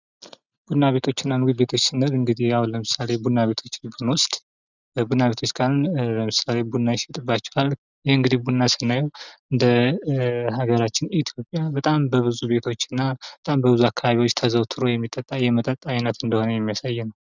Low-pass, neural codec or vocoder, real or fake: 7.2 kHz; none; real